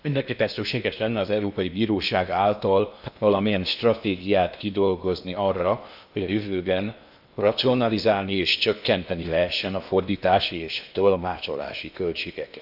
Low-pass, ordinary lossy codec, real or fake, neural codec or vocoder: 5.4 kHz; none; fake; codec, 16 kHz in and 24 kHz out, 0.8 kbps, FocalCodec, streaming, 65536 codes